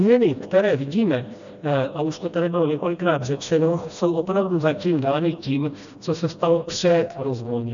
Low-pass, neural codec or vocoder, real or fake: 7.2 kHz; codec, 16 kHz, 1 kbps, FreqCodec, smaller model; fake